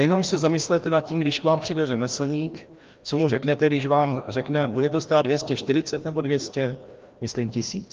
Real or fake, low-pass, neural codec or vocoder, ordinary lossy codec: fake; 7.2 kHz; codec, 16 kHz, 1 kbps, FreqCodec, larger model; Opus, 32 kbps